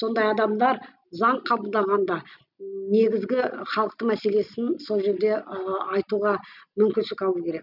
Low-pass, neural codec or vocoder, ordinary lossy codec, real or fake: 5.4 kHz; none; none; real